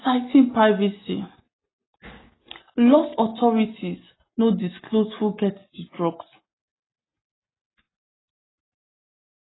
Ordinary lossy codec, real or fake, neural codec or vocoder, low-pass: AAC, 16 kbps; real; none; 7.2 kHz